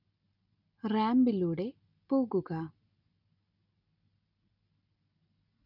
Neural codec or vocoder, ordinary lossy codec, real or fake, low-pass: none; none; real; 5.4 kHz